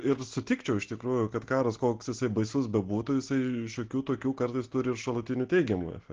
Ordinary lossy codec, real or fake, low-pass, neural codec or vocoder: Opus, 16 kbps; real; 7.2 kHz; none